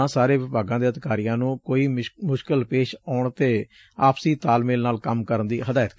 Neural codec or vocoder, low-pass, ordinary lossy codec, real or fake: none; none; none; real